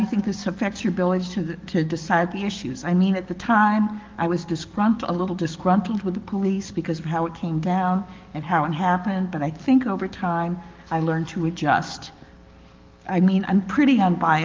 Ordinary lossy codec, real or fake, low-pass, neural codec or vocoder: Opus, 32 kbps; fake; 7.2 kHz; codec, 44.1 kHz, 7.8 kbps, DAC